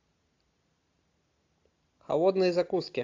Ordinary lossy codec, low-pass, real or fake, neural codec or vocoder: AAC, 48 kbps; 7.2 kHz; real; none